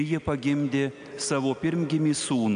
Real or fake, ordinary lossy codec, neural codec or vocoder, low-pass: real; AAC, 96 kbps; none; 9.9 kHz